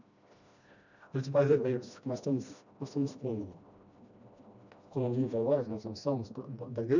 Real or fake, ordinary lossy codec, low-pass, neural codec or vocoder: fake; none; 7.2 kHz; codec, 16 kHz, 1 kbps, FreqCodec, smaller model